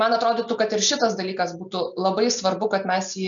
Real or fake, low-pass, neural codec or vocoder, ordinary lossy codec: real; 7.2 kHz; none; MP3, 96 kbps